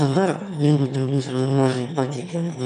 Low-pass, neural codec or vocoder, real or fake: 9.9 kHz; autoencoder, 22.05 kHz, a latent of 192 numbers a frame, VITS, trained on one speaker; fake